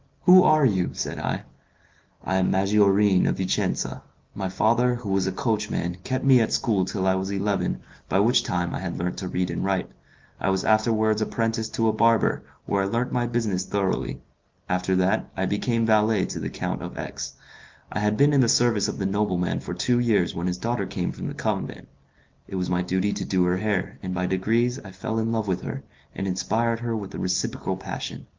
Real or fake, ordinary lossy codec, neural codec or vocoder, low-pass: real; Opus, 24 kbps; none; 7.2 kHz